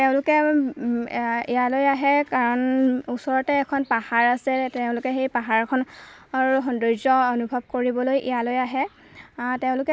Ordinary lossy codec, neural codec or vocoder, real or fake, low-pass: none; none; real; none